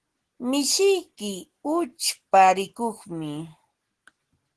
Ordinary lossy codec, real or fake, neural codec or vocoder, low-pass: Opus, 16 kbps; real; none; 10.8 kHz